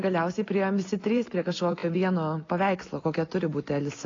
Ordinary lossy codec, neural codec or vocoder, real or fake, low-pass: AAC, 32 kbps; none; real; 7.2 kHz